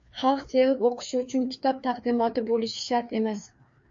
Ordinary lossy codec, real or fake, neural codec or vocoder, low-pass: MP3, 48 kbps; fake; codec, 16 kHz, 2 kbps, FreqCodec, larger model; 7.2 kHz